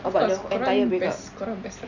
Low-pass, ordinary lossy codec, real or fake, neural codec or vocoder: 7.2 kHz; none; real; none